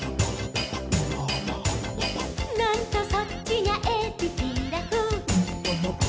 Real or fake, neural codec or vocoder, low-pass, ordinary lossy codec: real; none; none; none